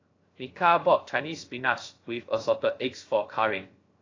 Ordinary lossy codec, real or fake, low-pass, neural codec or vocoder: AAC, 32 kbps; fake; 7.2 kHz; codec, 16 kHz, 0.3 kbps, FocalCodec